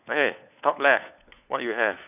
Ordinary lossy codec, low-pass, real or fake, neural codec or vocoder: none; 3.6 kHz; fake; codec, 16 kHz, 4 kbps, FunCodec, trained on LibriTTS, 50 frames a second